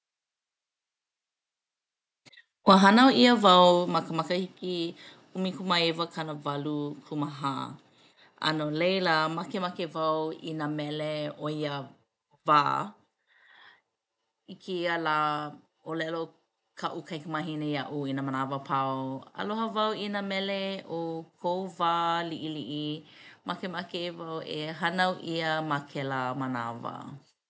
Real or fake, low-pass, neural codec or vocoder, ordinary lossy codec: real; none; none; none